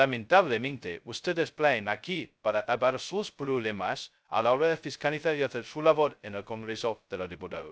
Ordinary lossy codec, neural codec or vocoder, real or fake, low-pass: none; codec, 16 kHz, 0.2 kbps, FocalCodec; fake; none